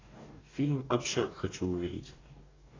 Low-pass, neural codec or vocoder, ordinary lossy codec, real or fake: 7.2 kHz; codec, 44.1 kHz, 2.6 kbps, DAC; AAC, 32 kbps; fake